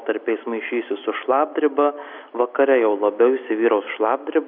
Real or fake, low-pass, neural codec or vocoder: real; 5.4 kHz; none